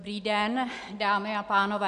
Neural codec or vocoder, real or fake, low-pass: none; real; 9.9 kHz